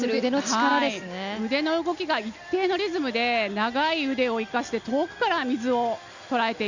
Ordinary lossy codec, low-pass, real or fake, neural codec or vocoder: Opus, 64 kbps; 7.2 kHz; real; none